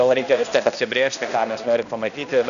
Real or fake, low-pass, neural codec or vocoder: fake; 7.2 kHz; codec, 16 kHz, 1 kbps, X-Codec, HuBERT features, trained on balanced general audio